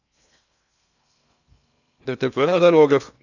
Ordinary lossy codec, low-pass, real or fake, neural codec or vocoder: none; 7.2 kHz; fake; codec, 16 kHz in and 24 kHz out, 0.6 kbps, FocalCodec, streaming, 2048 codes